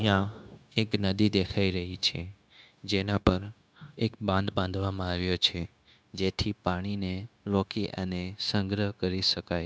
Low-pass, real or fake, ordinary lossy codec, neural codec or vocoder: none; fake; none; codec, 16 kHz, 0.9 kbps, LongCat-Audio-Codec